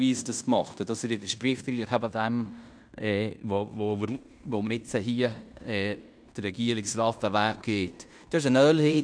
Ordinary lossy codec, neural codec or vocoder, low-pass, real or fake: none; codec, 16 kHz in and 24 kHz out, 0.9 kbps, LongCat-Audio-Codec, fine tuned four codebook decoder; 9.9 kHz; fake